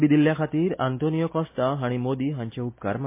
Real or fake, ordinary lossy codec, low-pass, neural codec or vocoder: real; MP3, 24 kbps; 3.6 kHz; none